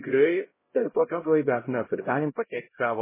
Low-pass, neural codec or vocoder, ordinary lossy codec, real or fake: 3.6 kHz; codec, 16 kHz, 0.5 kbps, X-Codec, HuBERT features, trained on LibriSpeech; MP3, 16 kbps; fake